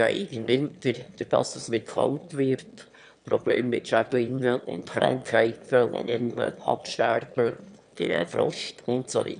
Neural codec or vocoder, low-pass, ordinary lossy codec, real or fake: autoencoder, 22.05 kHz, a latent of 192 numbers a frame, VITS, trained on one speaker; 9.9 kHz; none; fake